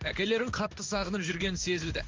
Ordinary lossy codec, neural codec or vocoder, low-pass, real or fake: Opus, 32 kbps; codec, 16 kHz in and 24 kHz out, 1 kbps, XY-Tokenizer; 7.2 kHz; fake